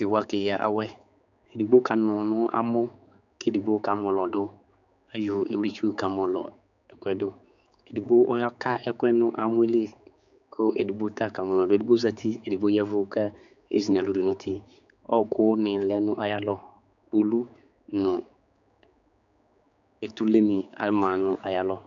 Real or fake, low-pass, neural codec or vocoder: fake; 7.2 kHz; codec, 16 kHz, 4 kbps, X-Codec, HuBERT features, trained on general audio